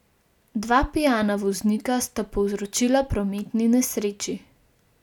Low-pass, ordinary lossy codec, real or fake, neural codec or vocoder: 19.8 kHz; none; real; none